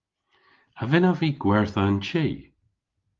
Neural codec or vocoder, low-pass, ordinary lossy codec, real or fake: none; 7.2 kHz; Opus, 32 kbps; real